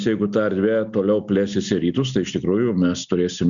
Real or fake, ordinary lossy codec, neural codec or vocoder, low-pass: real; MP3, 96 kbps; none; 7.2 kHz